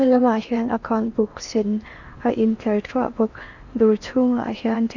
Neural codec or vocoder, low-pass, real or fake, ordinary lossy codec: codec, 16 kHz in and 24 kHz out, 0.8 kbps, FocalCodec, streaming, 65536 codes; 7.2 kHz; fake; Opus, 64 kbps